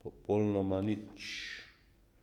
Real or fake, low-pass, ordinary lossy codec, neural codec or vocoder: fake; 19.8 kHz; Opus, 64 kbps; codec, 44.1 kHz, 7.8 kbps, DAC